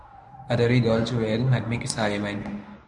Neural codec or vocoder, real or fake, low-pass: codec, 24 kHz, 0.9 kbps, WavTokenizer, medium speech release version 1; fake; 10.8 kHz